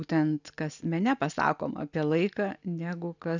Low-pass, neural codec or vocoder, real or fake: 7.2 kHz; none; real